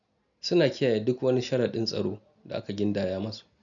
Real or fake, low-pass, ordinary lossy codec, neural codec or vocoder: real; 7.2 kHz; none; none